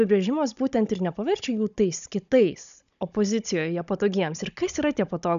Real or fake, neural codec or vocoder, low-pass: fake; codec, 16 kHz, 16 kbps, FunCodec, trained on Chinese and English, 50 frames a second; 7.2 kHz